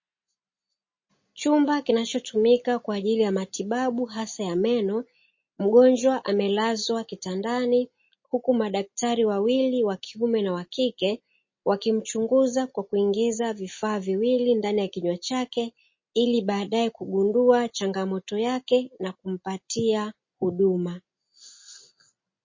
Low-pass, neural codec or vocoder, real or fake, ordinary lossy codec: 7.2 kHz; none; real; MP3, 32 kbps